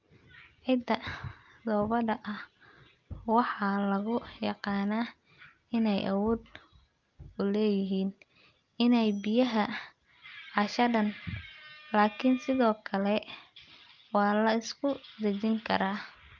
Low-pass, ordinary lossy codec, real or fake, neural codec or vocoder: 7.2 kHz; Opus, 24 kbps; real; none